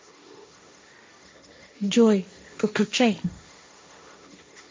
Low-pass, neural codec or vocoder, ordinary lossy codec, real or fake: none; codec, 16 kHz, 1.1 kbps, Voila-Tokenizer; none; fake